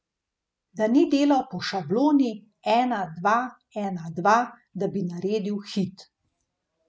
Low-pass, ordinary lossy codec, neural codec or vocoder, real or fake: none; none; none; real